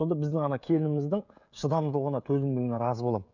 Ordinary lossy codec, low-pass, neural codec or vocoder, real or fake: none; 7.2 kHz; codec, 16 kHz, 16 kbps, FreqCodec, smaller model; fake